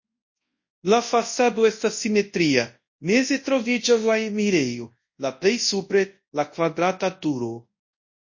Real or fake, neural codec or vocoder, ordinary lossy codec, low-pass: fake; codec, 24 kHz, 0.9 kbps, WavTokenizer, large speech release; MP3, 32 kbps; 7.2 kHz